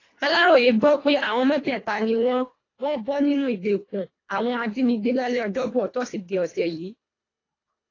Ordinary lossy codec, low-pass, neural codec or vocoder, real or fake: AAC, 32 kbps; 7.2 kHz; codec, 24 kHz, 1.5 kbps, HILCodec; fake